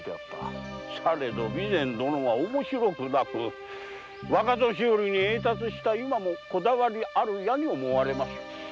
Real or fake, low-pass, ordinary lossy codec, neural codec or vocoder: real; none; none; none